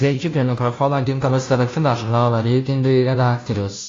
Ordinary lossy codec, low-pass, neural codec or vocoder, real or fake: AAC, 32 kbps; 7.2 kHz; codec, 16 kHz, 0.5 kbps, FunCodec, trained on Chinese and English, 25 frames a second; fake